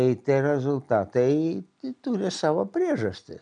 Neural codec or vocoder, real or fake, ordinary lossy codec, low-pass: none; real; MP3, 96 kbps; 9.9 kHz